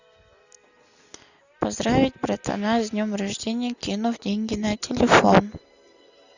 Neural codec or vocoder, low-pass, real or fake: none; 7.2 kHz; real